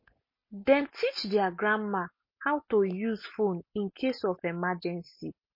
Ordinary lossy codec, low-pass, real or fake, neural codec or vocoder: MP3, 24 kbps; 5.4 kHz; real; none